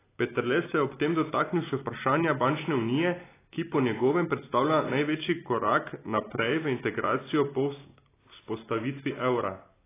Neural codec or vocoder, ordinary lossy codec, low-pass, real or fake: none; AAC, 16 kbps; 3.6 kHz; real